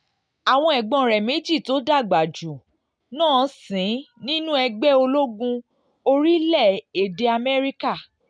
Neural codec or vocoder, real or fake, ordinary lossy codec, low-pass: none; real; none; 9.9 kHz